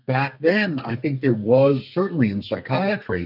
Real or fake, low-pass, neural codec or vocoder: fake; 5.4 kHz; codec, 32 kHz, 1.9 kbps, SNAC